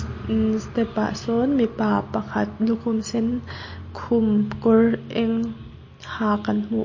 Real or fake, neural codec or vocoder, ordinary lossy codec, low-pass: real; none; MP3, 32 kbps; 7.2 kHz